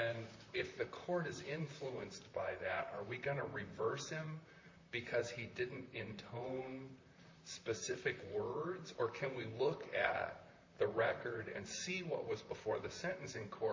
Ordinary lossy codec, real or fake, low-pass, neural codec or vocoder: MP3, 64 kbps; fake; 7.2 kHz; vocoder, 44.1 kHz, 128 mel bands, Pupu-Vocoder